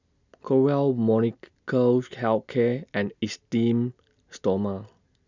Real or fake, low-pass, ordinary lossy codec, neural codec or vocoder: real; 7.2 kHz; none; none